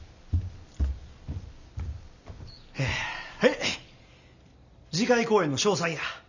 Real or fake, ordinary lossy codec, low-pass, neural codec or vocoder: real; none; 7.2 kHz; none